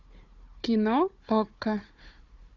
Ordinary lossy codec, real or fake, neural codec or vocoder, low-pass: Opus, 64 kbps; fake; codec, 16 kHz, 4 kbps, FunCodec, trained on Chinese and English, 50 frames a second; 7.2 kHz